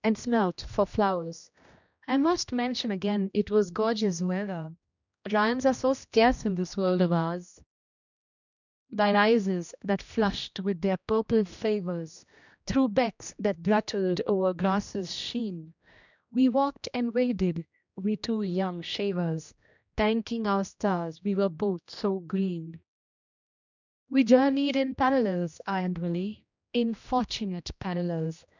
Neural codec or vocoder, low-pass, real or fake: codec, 16 kHz, 1 kbps, X-Codec, HuBERT features, trained on general audio; 7.2 kHz; fake